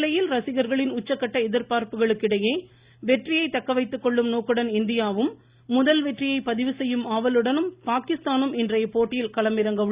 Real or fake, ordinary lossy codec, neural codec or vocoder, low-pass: real; Opus, 64 kbps; none; 3.6 kHz